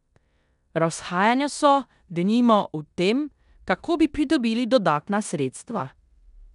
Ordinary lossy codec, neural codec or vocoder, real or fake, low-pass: none; codec, 16 kHz in and 24 kHz out, 0.9 kbps, LongCat-Audio-Codec, four codebook decoder; fake; 10.8 kHz